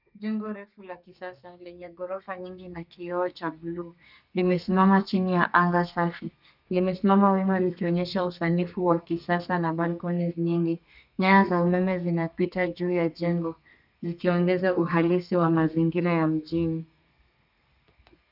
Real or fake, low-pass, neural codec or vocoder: fake; 5.4 kHz; codec, 32 kHz, 1.9 kbps, SNAC